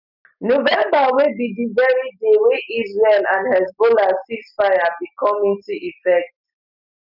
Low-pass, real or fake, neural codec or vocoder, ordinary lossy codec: 5.4 kHz; real; none; none